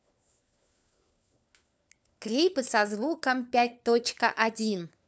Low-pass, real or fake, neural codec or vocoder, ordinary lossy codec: none; fake; codec, 16 kHz, 4 kbps, FunCodec, trained on LibriTTS, 50 frames a second; none